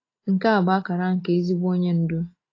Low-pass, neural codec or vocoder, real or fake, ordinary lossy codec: 7.2 kHz; none; real; AAC, 32 kbps